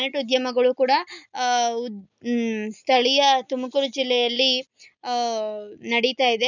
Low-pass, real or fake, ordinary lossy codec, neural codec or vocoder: 7.2 kHz; real; none; none